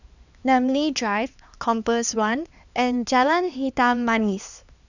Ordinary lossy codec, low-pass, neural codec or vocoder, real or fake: none; 7.2 kHz; codec, 16 kHz, 2 kbps, X-Codec, HuBERT features, trained on LibriSpeech; fake